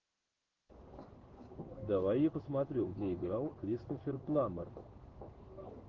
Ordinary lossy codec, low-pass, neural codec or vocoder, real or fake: Opus, 16 kbps; 7.2 kHz; codec, 16 kHz in and 24 kHz out, 1 kbps, XY-Tokenizer; fake